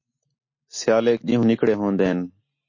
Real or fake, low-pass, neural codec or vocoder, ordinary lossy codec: real; 7.2 kHz; none; MP3, 32 kbps